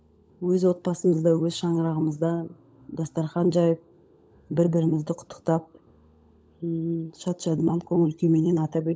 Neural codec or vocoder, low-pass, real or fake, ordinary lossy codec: codec, 16 kHz, 16 kbps, FunCodec, trained on LibriTTS, 50 frames a second; none; fake; none